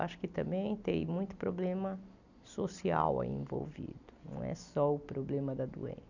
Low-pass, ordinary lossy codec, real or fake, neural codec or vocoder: 7.2 kHz; none; real; none